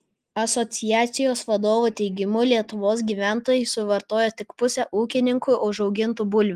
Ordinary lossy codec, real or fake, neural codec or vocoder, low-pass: Opus, 24 kbps; real; none; 14.4 kHz